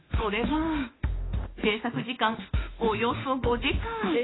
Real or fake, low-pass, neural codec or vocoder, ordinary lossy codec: fake; 7.2 kHz; autoencoder, 48 kHz, 32 numbers a frame, DAC-VAE, trained on Japanese speech; AAC, 16 kbps